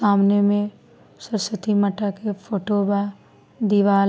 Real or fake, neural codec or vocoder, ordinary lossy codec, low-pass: real; none; none; none